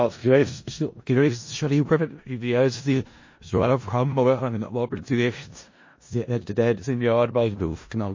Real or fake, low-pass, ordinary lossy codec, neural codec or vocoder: fake; 7.2 kHz; MP3, 32 kbps; codec, 16 kHz in and 24 kHz out, 0.4 kbps, LongCat-Audio-Codec, four codebook decoder